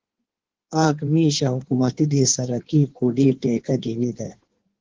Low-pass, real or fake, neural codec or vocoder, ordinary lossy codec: 7.2 kHz; fake; codec, 16 kHz in and 24 kHz out, 1.1 kbps, FireRedTTS-2 codec; Opus, 16 kbps